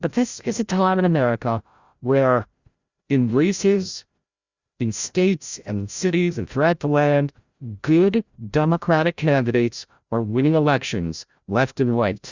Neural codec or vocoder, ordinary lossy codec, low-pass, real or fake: codec, 16 kHz, 0.5 kbps, FreqCodec, larger model; Opus, 64 kbps; 7.2 kHz; fake